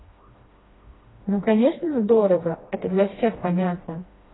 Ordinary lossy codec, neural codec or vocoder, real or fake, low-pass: AAC, 16 kbps; codec, 16 kHz, 1 kbps, FreqCodec, smaller model; fake; 7.2 kHz